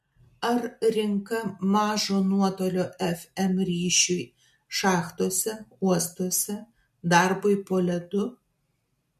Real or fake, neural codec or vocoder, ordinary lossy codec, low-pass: real; none; MP3, 64 kbps; 14.4 kHz